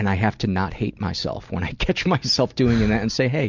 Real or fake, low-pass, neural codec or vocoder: real; 7.2 kHz; none